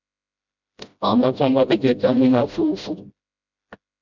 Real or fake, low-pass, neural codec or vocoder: fake; 7.2 kHz; codec, 16 kHz, 0.5 kbps, FreqCodec, smaller model